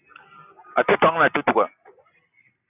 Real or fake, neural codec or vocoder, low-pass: real; none; 3.6 kHz